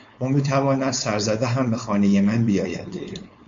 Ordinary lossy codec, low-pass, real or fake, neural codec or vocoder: MP3, 48 kbps; 7.2 kHz; fake; codec, 16 kHz, 4.8 kbps, FACodec